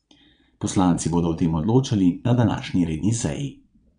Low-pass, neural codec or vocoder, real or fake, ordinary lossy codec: 9.9 kHz; vocoder, 22.05 kHz, 80 mel bands, Vocos; fake; none